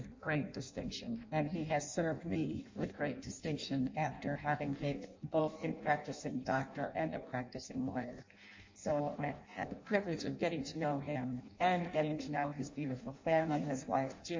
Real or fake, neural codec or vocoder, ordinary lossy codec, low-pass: fake; codec, 16 kHz in and 24 kHz out, 0.6 kbps, FireRedTTS-2 codec; MP3, 48 kbps; 7.2 kHz